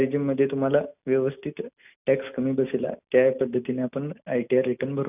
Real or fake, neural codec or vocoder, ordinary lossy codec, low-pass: real; none; none; 3.6 kHz